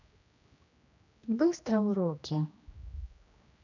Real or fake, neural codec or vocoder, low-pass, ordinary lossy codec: fake; codec, 16 kHz, 1 kbps, X-Codec, HuBERT features, trained on general audio; 7.2 kHz; none